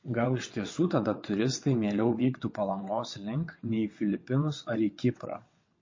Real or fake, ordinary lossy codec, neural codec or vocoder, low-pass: fake; MP3, 32 kbps; vocoder, 22.05 kHz, 80 mel bands, WaveNeXt; 7.2 kHz